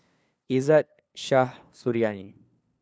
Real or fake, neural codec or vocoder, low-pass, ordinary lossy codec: fake; codec, 16 kHz, 2 kbps, FunCodec, trained on LibriTTS, 25 frames a second; none; none